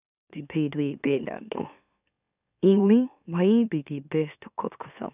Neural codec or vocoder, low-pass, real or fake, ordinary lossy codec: autoencoder, 44.1 kHz, a latent of 192 numbers a frame, MeloTTS; 3.6 kHz; fake; none